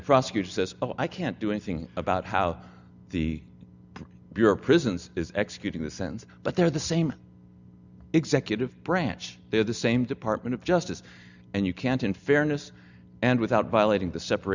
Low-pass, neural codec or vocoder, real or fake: 7.2 kHz; none; real